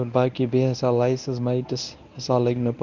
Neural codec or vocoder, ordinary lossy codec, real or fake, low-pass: codec, 24 kHz, 0.9 kbps, WavTokenizer, medium speech release version 1; none; fake; 7.2 kHz